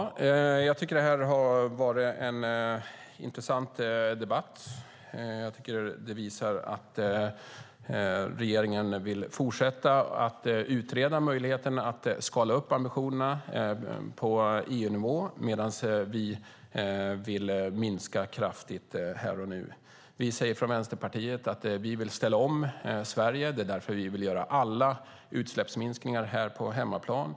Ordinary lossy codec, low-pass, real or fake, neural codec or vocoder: none; none; real; none